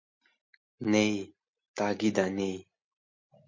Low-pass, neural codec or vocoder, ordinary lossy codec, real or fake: 7.2 kHz; none; MP3, 48 kbps; real